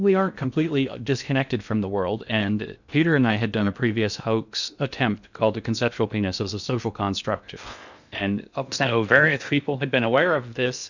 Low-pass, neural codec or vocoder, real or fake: 7.2 kHz; codec, 16 kHz in and 24 kHz out, 0.6 kbps, FocalCodec, streaming, 2048 codes; fake